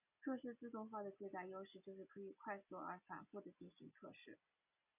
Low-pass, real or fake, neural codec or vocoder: 3.6 kHz; real; none